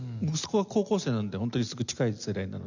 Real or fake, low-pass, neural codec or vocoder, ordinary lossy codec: real; 7.2 kHz; none; none